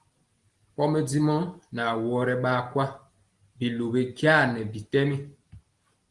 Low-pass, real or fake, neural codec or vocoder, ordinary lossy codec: 10.8 kHz; real; none; Opus, 24 kbps